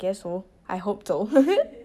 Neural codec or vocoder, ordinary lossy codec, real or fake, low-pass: none; none; real; 14.4 kHz